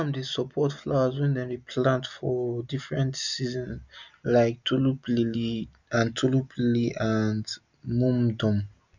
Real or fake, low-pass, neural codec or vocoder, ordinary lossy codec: real; 7.2 kHz; none; none